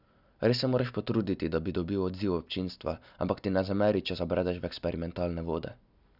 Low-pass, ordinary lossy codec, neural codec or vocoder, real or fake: 5.4 kHz; none; none; real